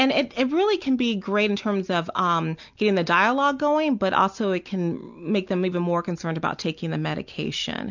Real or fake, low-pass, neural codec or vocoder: real; 7.2 kHz; none